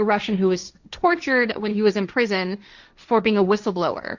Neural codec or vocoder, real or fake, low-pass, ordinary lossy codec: codec, 16 kHz, 1.1 kbps, Voila-Tokenizer; fake; 7.2 kHz; Opus, 64 kbps